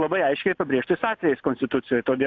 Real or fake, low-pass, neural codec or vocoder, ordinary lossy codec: real; 7.2 kHz; none; AAC, 48 kbps